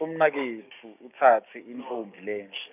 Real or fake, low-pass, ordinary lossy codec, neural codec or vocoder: fake; 3.6 kHz; none; codec, 16 kHz, 6 kbps, DAC